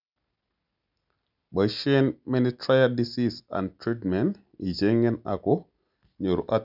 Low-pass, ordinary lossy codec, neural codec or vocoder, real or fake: 5.4 kHz; none; none; real